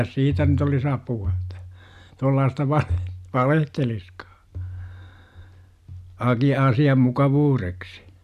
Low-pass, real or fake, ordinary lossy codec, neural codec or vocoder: 14.4 kHz; real; none; none